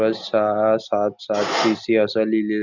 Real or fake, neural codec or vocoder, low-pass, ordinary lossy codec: real; none; 7.2 kHz; Opus, 64 kbps